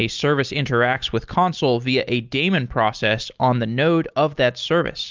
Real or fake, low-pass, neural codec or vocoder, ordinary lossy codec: real; 7.2 kHz; none; Opus, 24 kbps